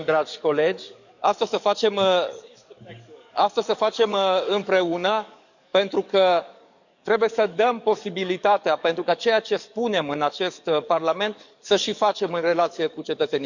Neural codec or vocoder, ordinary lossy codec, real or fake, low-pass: codec, 44.1 kHz, 7.8 kbps, DAC; none; fake; 7.2 kHz